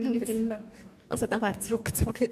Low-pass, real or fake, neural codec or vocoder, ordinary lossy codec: 14.4 kHz; fake; codec, 44.1 kHz, 2.6 kbps, DAC; MP3, 96 kbps